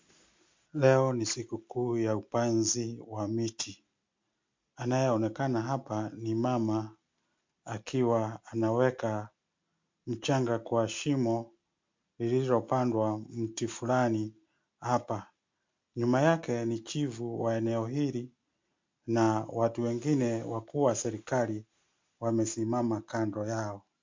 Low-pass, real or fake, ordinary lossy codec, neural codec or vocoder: 7.2 kHz; real; MP3, 48 kbps; none